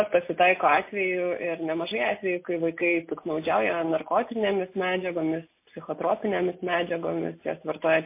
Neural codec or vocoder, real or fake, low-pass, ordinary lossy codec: none; real; 3.6 kHz; MP3, 24 kbps